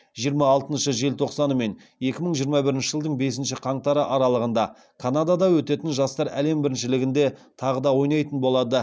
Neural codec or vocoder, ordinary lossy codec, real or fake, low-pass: none; none; real; none